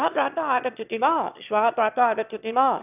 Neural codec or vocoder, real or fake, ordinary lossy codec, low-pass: autoencoder, 22.05 kHz, a latent of 192 numbers a frame, VITS, trained on one speaker; fake; none; 3.6 kHz